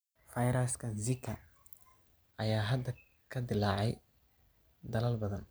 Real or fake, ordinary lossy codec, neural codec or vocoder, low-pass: real; none; none; none